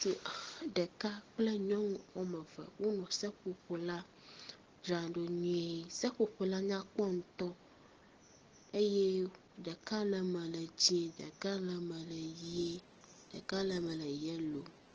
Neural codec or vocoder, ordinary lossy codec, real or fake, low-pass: none; Opus, 16 kbps; real; 7.2 kHz